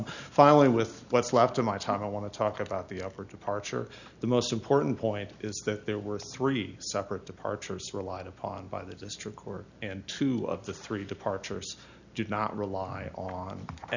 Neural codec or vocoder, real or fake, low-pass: none; real; 7.2 kHz